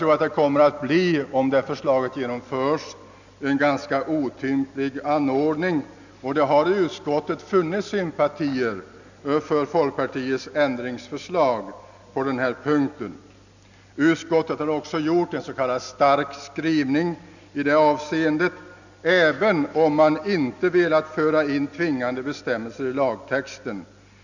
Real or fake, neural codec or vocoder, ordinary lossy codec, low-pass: real; none; none; 7.2 kHz